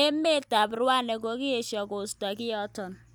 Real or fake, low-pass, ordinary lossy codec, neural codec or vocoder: real; none; none; none